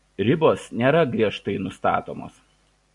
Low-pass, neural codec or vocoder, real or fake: 10.8 kHz; none; real